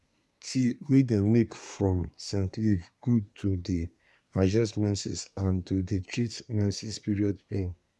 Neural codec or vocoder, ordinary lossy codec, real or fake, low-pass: codec, 24 kHz, 1 kbps, SNAC; none; fake; none